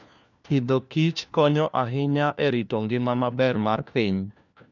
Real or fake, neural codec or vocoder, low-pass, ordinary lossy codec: fake; codec, 16 kHz, 1 kbps, FunCodec, trained on LibriTTS, 50 frames a second; 7.2 kHz; none